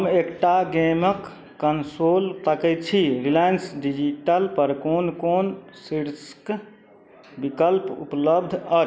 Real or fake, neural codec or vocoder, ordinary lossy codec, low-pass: real; none; none; none